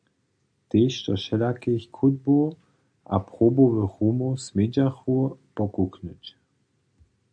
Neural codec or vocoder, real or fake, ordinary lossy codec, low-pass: none; real; MP3, 64 kbps; 9.9 kHz